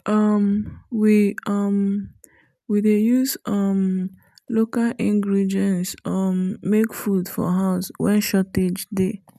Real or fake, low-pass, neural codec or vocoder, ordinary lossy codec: real; 14.4 kHz; none; none